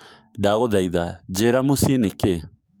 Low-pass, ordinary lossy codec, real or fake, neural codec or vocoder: none; none; fake; codec, 44.1 kHz, 7.8 kbps, DAC